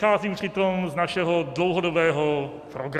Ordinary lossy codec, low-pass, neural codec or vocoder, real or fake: AAC, 96 kbps; 14.4 kHz; none; real